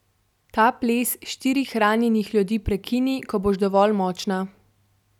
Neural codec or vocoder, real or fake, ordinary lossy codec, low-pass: none; real; none; 19.8 kHz